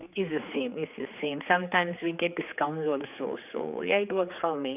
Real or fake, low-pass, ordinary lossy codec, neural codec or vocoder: fake; 3.6 kHz; none; codec, 16 kHz, 4 kbps, X-Codec, HuBERT features, trained on general audio